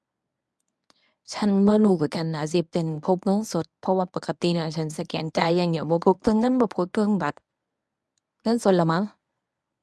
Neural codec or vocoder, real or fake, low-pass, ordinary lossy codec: codec, 24 kHz, 0.9 kbps, WavTokenizer, medium speech release version 1; fake; none; none